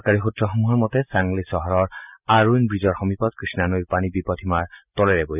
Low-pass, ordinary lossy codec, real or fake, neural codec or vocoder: 3.6 kHz; none; real; none